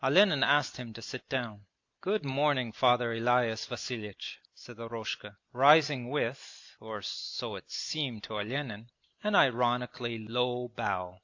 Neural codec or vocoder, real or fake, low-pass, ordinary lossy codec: none; real; 7.2 kHz; AAC, 48 kbps